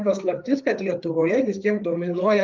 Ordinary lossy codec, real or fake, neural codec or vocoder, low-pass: Opus, 32 kbps; fake; codec, 16 kHz, 4 kbps, FreqCodec, larger model; 7.2 kHz